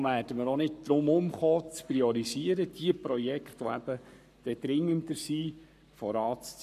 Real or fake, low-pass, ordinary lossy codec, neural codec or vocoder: fake; 14.4 kHz; none; codec, 44.1 kHz, 7.8 kbps, Pupu-Codec